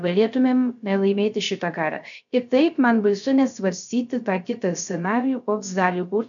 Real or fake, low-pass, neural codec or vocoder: fake; 7.2 kHz; codec, 16 kHz, 0.3 kbps, FocalCodec